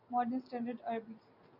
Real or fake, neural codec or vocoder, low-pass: real; none; 5.4 kHz